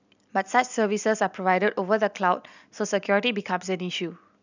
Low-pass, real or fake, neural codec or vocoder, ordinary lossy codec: 7.2 kHz; real; none; none